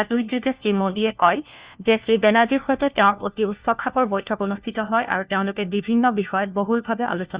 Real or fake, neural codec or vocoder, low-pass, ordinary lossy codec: fake; codec, 16 kHz, 1 kbps, FunCodec, trained on LibriTTS, 50 frames a second; 3.6 kHz; Opus, 64 kbps